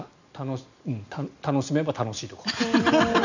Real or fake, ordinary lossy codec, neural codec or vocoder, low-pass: real; none; none; 7.2 kHz